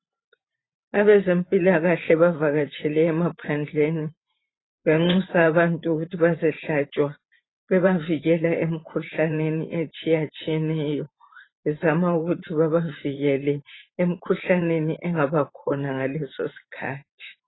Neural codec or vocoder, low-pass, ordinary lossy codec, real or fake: vocoder, 44.1 kHz, 128 mel bands every 512 samples, BigVGAN v2; 7.2 kHz; AAC, 16 kbps; fake